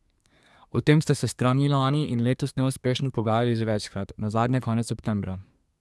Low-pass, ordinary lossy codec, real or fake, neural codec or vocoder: none; none; fake; codec, 24 kHz, 1 kbps, SNAC